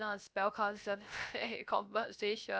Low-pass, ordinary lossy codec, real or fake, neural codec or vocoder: none; none; fake; codec, 16 kHz, 0.3 kbps, FocalCodec